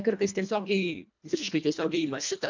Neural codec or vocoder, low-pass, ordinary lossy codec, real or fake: codec, 24 kHz, 1.5 kbps, HILCodec; 7.2 kHz; MP3, 64 kbps; fake